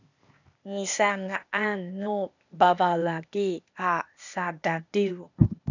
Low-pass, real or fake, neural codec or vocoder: 7.2 kHz; fake; codec, 16 kHz, 0.8 kbps, ZipCodec